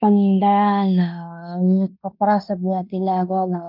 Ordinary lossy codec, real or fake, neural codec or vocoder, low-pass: none; fake; codec, 16 kHz in and 24 kHz out, 0.9 kbps, LongCat-Audio-Codec, fine tuned four codebook decoder; 5.4 kHz